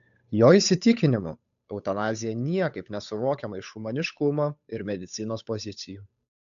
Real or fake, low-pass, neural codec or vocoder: fake; 7.2 kHz; codec, 16 kHz, 8 kbps, FunCodec, trained on Chinese and English, 25 frames a second